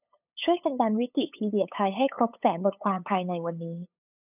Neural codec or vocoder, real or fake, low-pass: codec, 16 kHz, 8 kbps, FunCodec, trained on LibriTTS, 25 frames a second; fake; 3.6 kHz